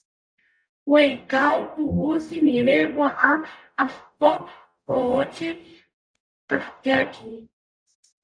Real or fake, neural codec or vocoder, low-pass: fake; codec, 44.1 kHz, 0.9 kbps, DAC; 9.9 kHz